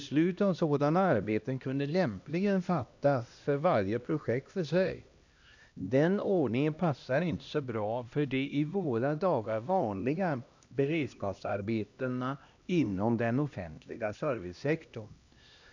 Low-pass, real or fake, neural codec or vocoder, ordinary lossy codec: 7.2 kHz; fake; codec, 16 kHz, 1 kbps, X-Codec, HuBERT features, trained on LibriSpeech; none